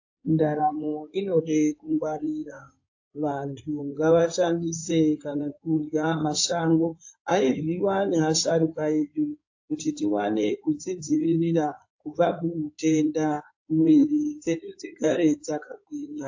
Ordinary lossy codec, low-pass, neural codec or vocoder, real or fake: AAC, 48 kbps; 7.2 kHz; codec, 16 kHz in and 24 kHz out, 2.2 kbps, FireRedTTS-2 codec; fake